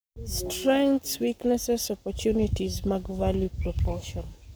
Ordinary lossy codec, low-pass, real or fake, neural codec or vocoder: none; none; fake; codec, 44.1 kHz, 7.8 kbps, Pupu-Codec